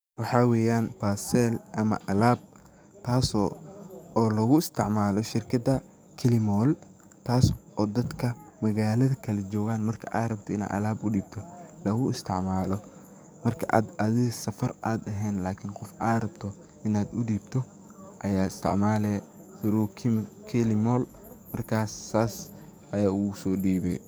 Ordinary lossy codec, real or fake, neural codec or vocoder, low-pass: none; fake; codec, 44.1 kHz, 7.8 kbps, DAC; none